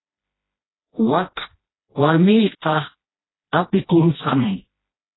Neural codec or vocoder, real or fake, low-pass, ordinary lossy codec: codec, 16 kHz, 1 kbps, FreqCodec, smaller model; fake; 7.2 kHz; AAC, 16 kbps